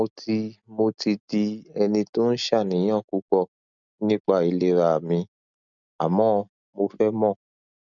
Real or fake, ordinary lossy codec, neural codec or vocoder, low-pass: real; none; none; 7.2 kHz